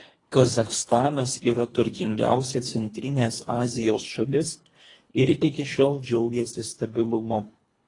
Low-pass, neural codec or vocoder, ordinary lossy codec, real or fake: 10.8 kHz; codec, 24 kHz, 1.5 kbps, HILCodec; AAC, 32 kbps; fake